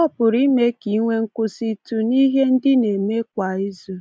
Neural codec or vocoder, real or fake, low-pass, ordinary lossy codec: none; real; none; none